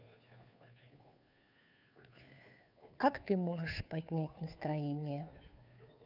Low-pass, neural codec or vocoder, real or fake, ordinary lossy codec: 5.4 kHz; codec, 16 kHz, 2 kbps, FunCodec, trained on Chinese and English, 25 frames a second; fake; none